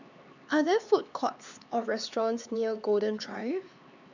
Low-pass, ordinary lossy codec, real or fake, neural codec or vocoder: 7.2 kHz; none; fake; codec, 16 kHz, 4 kbps, X-Codec, HuBERT features, trained on LibriSpeech